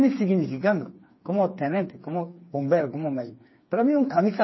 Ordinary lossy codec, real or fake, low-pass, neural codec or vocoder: MP3, 24 kbps; fake; 7.2 kHz; codec, 16 kHz, 4 kbps, FreqCodec, smaller model